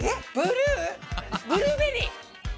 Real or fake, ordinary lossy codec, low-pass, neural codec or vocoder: real; none; none; none